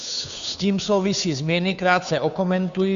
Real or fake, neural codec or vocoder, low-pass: fake; codec, 16 kHz, 2 kbps, FunCodec, trained on Chinese and English, 25 frames a second; 7.2 kHz